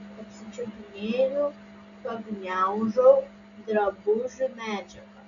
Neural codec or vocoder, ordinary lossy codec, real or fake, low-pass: none; MP3, 96 kbps; real; 7.2 kHz